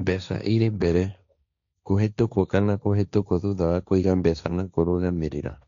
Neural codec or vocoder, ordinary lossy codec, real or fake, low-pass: codec, 16 kHz, 1.1 kbps, Voila-Tokenizer; none; fake; 7.2 kHz